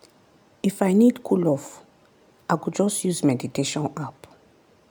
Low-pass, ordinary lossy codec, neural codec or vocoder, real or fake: none; none; none; real